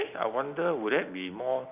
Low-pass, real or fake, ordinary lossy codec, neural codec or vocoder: 3.6 kHz; real; none; none